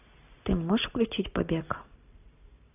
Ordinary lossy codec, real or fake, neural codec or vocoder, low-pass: AAC, 32 kbps; real; none; 3.6 kHz